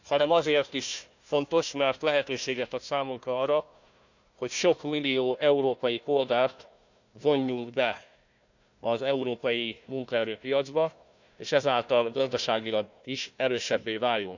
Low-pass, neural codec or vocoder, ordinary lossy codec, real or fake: 7.2 kHz; codec, 16 kHz, 1 kbps, FunCodec, trained on Chinese and English, 50 frames a second; none; fake